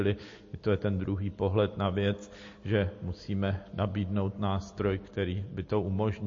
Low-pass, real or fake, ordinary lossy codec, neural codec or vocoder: 7.2 kHz; real; MP3, 32 kbps; none